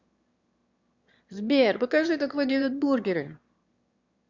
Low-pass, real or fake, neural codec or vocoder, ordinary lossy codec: 7.2 kHz; fake; autoencoder, 22.05 kHz, a latent of 192 numbers a frame, VITS, trained on one speaker; Opus, 64 kbps